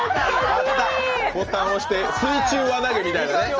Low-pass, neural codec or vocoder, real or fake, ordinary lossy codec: 7.2 kHz; none; real; Opus, 24 kbps